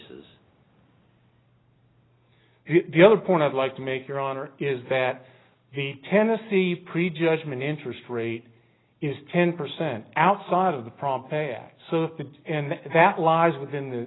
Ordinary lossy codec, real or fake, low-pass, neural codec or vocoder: AAC, 16 kbps; real; 7.2 kHz; none